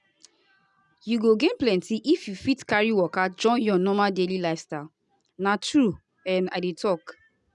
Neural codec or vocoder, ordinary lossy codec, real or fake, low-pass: none; none; real; 10.8 kHz